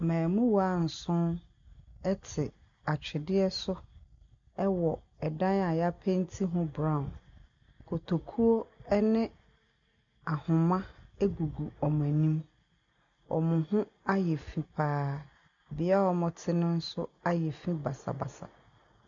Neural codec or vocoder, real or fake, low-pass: none; real; 7.2 kHz